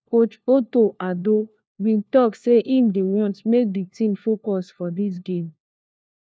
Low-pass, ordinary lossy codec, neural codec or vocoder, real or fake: none; none; codec, 16 kHz, 1 kbps, FunCodec, trained on LibriTTS, 50 frames a second; fake